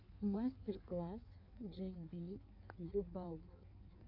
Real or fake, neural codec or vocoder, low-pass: fake; codec, 16 kHz in and 24 kHz out, 1.1 kbps, FireRedTTS-2 codec; 5.4 kHz